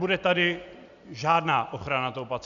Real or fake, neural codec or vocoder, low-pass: real; none; 7.2 kHz